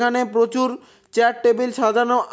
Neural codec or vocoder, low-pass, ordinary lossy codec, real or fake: none; none; none; real